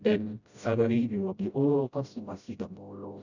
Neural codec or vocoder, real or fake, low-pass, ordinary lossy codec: codec, 16 kHz, 0.5 kbps, FreqCodec, smaller model; fake; 7.2 kHz; none